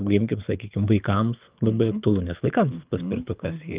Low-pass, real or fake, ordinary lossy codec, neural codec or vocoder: 3.6 kHz; fake; Opus, 24 kbps; codec, 24 kHz, 6 kbps, HILCodec